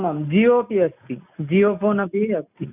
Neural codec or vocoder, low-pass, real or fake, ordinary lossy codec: none; 3.6 kHz; real; none